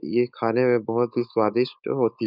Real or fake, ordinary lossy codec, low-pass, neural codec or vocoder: fake; none; 5.4 kHz; codec, 16 kHz, 4.8 kbps, FACodec